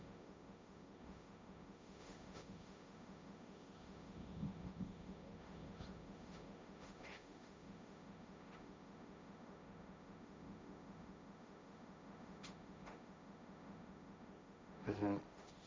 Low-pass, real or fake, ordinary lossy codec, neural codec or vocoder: none; fake; none; codec, 16 kHz, 1.1 kbps, Voila-Tokenizer